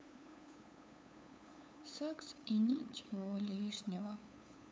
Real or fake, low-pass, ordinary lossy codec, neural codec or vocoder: fake; none; none; codec, 16 kHz, 8 kbps, FunCodec, trained on LibriTTS, 25 frames a second